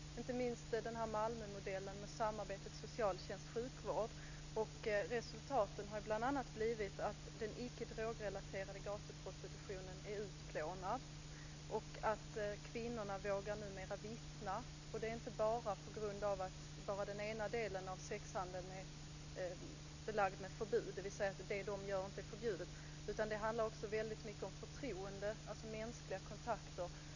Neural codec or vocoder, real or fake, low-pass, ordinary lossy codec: none; real; 7.2 kHz; none